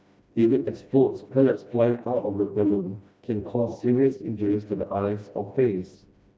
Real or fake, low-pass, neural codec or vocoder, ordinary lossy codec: fake; none; codec, 16 kHz, 1 kbps, FreqCodec, smaller model; none